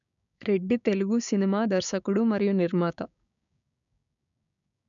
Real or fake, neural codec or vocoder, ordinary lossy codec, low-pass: fake; codec, 16 kHz, 6 kbps, DAC; none; 7.2 kHz